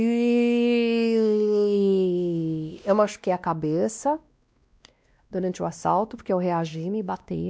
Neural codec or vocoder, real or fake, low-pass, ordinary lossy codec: codec, 16 kHz, 1 kbps, X-Codec, WavLM features, trained on Multilingual LibriSpeech; fake; none; none